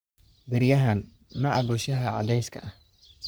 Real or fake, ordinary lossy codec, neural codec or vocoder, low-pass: fake; none; codec, 44.1 kHz, 3.4 kbps, Pupu-Codec; none